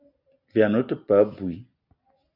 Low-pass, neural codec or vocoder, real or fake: 5.4 kHz; none; real